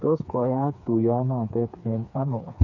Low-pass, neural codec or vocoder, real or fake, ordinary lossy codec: 7.2 kHz; codec, 16 kHz, 4 kbps, FreqCodec, smaller model; fake; none